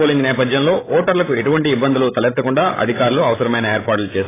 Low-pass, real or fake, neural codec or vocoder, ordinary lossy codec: 3.6 kHz; fake; codec, 16 kHz, 16 kbps, FreqCodec, larger model; AAC, 16 kbps